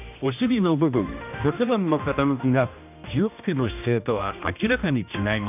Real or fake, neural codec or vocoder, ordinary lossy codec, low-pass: fake; codec, 16 kHz, 1 kbps, X-Codec, HuBERT features, trained on general audio; none; 3.6 kHz